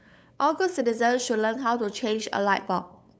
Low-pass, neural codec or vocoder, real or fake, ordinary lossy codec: none; codec, 16 kHz, 8 kbps, FunCodec, trained on LibriTTS, 25 frames a second; fake; none